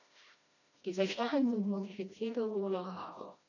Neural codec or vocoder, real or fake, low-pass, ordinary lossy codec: codec, 16 kHz, 1 kbps, FreqCodec, smaller model; fake; 7.2 kHz; none